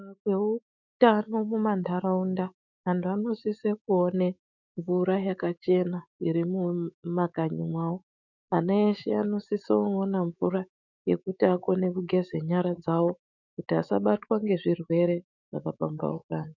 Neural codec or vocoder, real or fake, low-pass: autoencoder, 48 kHz, 128 numbers a frame, DAC-VAE, trained on Japanese speech; fake; 7.2 kHz